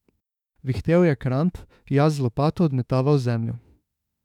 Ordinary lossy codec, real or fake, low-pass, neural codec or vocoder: none; fake; 19.8 kHz; autoencoder, 48 kHz, 32 numbers a frame, DAC-VAE, trained on Japanese speech